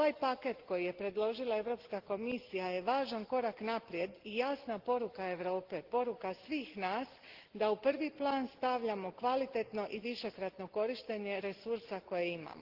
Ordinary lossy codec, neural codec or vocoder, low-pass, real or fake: Opus, 16 kbps; none; 5.4 kHz; real